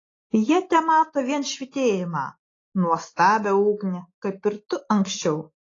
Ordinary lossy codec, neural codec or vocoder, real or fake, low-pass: AAC, 32 kbps; none; real; 7.2 kHz